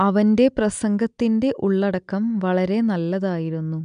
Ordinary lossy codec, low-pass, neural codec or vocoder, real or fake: none; 9.9 kHz; none; real